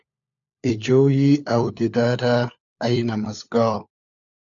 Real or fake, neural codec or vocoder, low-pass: fake; codec, 16 kHz, 4 kbps, FunCodec, trained on LibriTTS, 50 frames a second; 7.2 kHz